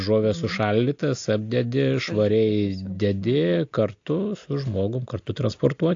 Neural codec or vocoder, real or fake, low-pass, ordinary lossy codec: none; real; 7.2 kHz; AAC, 48 kbps